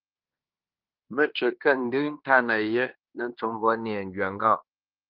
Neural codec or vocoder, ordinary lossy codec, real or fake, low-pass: codec, 16 kHz in and 24 kHz out, 0.9 kbps, LongCat-Audio-Codec, fine tuned four codebook decoder; Opus, 32 kbps; fake; 5.4 kHz